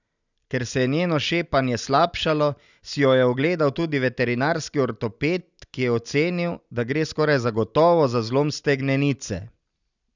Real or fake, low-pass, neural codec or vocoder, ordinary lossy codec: real; 7.2 kHz; none; none